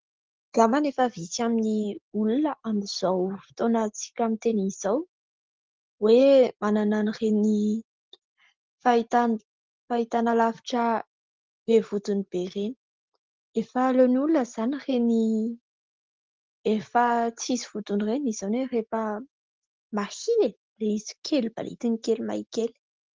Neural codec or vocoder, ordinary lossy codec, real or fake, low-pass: none; Opus, 16 kbps; real; 7.2 kHz